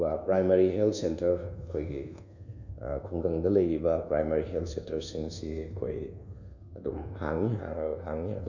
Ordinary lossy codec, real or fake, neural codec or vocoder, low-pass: none; fake; codec, 24 kHz, 1.2 kbps, DualCodec; 7.2 kHz